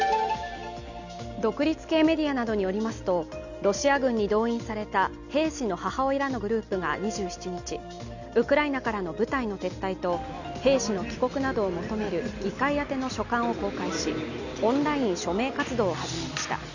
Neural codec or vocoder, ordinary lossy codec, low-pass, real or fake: none; none; 7.2 kHz; real